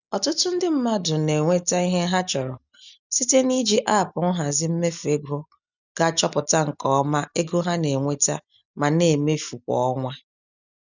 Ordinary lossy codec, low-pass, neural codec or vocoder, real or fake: none; 7.2 kHz; none; real